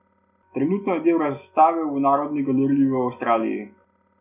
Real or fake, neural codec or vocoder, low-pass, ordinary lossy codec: real; none; 3.6 kHz; none